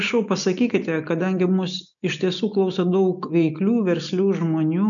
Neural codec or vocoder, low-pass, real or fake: none; 7.2 kHz; real